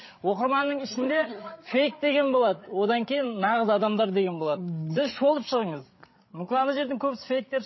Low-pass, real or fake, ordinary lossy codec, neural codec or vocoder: 7.2 kHz; fake; MP3, 24 kbps; codec, 16 kHz, 8 kbps, FreqCodec, larger model